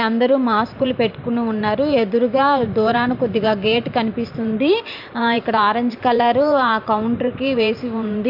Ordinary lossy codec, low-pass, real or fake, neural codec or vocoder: AAC, 48 kbps; 5.4 kHz; fake; vocoder, 44.1 kHz, 128 mel bands every 512 samples, BigVGAN v2